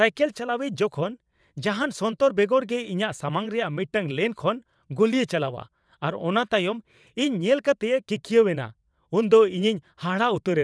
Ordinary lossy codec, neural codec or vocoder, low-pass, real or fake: none; vocoder, 22.05 kHz, 80 mel bands, WaveNeXt; none; fake